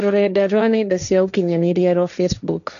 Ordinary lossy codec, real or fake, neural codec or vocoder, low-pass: none; fake; codec, 16 kHz, 1.1 kbps, Voila-Tokenizer; 7.2 kHz